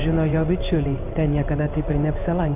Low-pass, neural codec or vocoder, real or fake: 3.6 kHz; codec, 16 kHz in and 24 kHz out, 1 kbps, XY-Tokenizer; fake